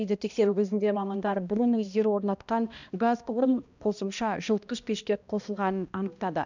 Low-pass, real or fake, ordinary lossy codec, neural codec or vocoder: 7.2 kHz; fake; none; codec, 16 kHz, 1 kbps, X-Codec, HuBERT features, trained on balanced general audio